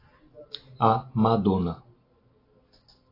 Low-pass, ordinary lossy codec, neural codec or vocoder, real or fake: 5.4 kHz; AAC, 24 kbps; none; real